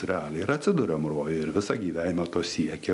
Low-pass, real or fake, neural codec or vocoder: 10.8 kHz; real; none